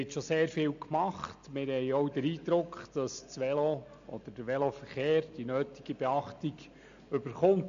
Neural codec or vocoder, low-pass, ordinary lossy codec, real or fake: none; 7.2 kHz; AAC, 64 kbps; real